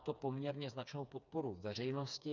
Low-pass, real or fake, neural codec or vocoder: 7.2 kHz; fake; codec, 16 kHz, 4 kbps, FreqCodec, smaller model